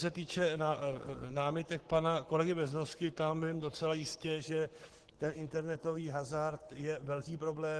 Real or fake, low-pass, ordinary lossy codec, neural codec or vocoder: fake; 10.8 kHz; Opus, 16 kbps; codec, 44.1 kHz, 7.8 kbps, Pupu-Codec